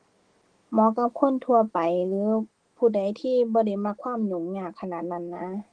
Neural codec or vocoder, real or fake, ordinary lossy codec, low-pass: autoencoder, 48 kHz, 128 numbers a frame, DAC-VAE, trained on Japanese speech; fake; Opus, 16 kbps; 9.9 kHz